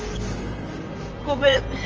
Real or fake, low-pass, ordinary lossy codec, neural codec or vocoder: fake; 7.2 kHz; Opus, 24 kbps; vocoder, 44.1 kHz, 128 mel bands, Pupu-Vocoder